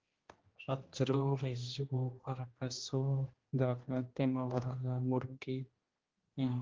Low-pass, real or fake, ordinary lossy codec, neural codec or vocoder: 7.2 kHz; fake; Opus, 16 kbps; codec, 16 kHz, 1 kbps, X-Codec, HuBERT features, trained on general audio